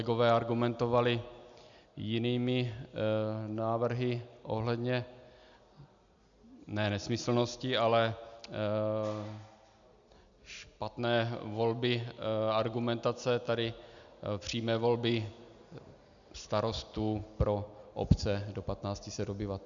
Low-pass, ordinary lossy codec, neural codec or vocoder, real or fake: 7.2 kHz; MP3, 96 kbps; none; real